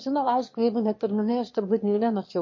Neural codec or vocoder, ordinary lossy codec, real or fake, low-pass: autoencoder, 22.05 kHz, a latent of 192 numbers a frame, VITS, trained on one speaker; MP3, 32 kbps; fake; 7.2 kHz